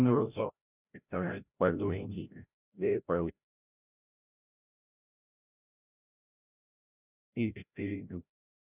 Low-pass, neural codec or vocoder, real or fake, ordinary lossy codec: 3.6 kHz; codec, 16 kHz, 0.5 kbps, FreqCodec, larger model; fake; none